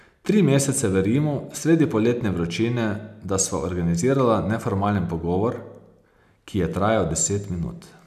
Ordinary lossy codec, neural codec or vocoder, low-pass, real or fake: none; none; 14.4 kHz; real